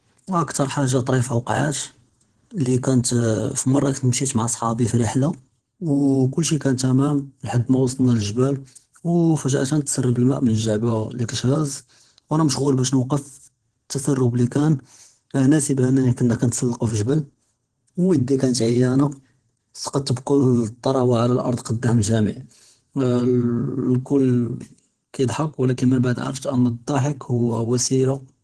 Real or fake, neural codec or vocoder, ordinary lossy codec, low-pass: fake; vocoder, 22.05 kHz, 80 mel bands, WaveNeXt; Opus, 16 kbps; 9.9 kHz